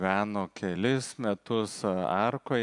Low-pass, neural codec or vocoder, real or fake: 10.8 kHz; none; real